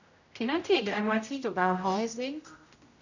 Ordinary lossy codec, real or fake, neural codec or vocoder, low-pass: none; fake; codec, 16 kHz, 0.5 kbps, X-Codec, HuBERT features, trained on general audio; 7.2 kHz